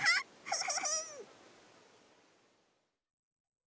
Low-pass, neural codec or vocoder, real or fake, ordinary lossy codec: none; none; real; none